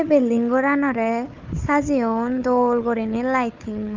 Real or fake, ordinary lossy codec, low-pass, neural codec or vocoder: fake; Opus, 24 kbps; 7.2 kHz; codec, 16 kHz, 4 kbps, FunCodec, trained on Chinese and English, 50 frames a second